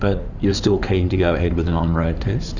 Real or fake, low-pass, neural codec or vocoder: fake; 7.2 kHz; codec, 16 kHz in and 24 kHz out, 2.2 kbps, FireRedTTS-2 codec